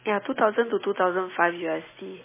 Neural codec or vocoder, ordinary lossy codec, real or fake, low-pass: none; MP3, 16 kbps; real; 3.6 kHz